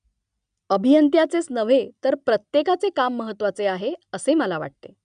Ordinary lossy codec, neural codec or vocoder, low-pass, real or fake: none; none; 9.9 kHz; real